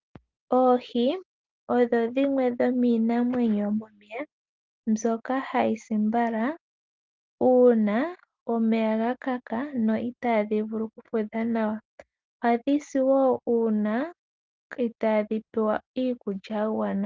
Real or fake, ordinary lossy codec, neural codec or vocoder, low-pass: real; Opus, 32 kbps; none; 7.2 kHz